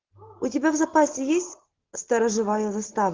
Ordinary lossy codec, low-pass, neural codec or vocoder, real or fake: Opus, 16 kbps; 7.2 kHz; none; real